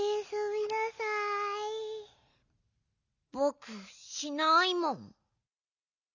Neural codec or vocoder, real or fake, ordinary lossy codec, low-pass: none; real; none; 7.2 kHz